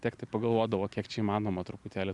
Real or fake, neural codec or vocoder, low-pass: real; none; 10.8 kHz